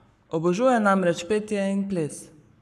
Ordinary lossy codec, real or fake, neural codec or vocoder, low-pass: none; fake; codec, 44.1 kHz, 7.8 kbps, Pupu-Codec; 14.4 kHz